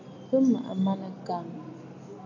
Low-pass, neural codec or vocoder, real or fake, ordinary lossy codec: 7.2 kHz; none; real; AAC, 48 kbps